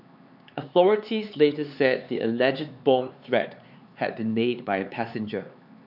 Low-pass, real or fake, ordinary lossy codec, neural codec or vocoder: 5.4 kHz; fake; none; codec, 16 kHz, 4 kbps, X-Codec, HuBERT features, trained on LibriSpeech